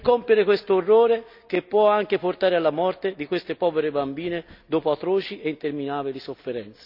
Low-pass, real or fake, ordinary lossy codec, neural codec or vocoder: 5.4 kHz; real; none; none